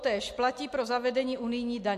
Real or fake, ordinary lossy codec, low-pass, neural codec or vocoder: real; MP3, 64 kbps; 14.4 kHz; none